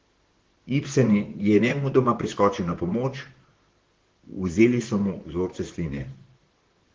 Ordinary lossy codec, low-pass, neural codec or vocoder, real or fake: Opus, 16 kbps; 7.2 kHz; vocoder, 44.1 kHz, 128 mel bands, Pupu-Vocoder; fake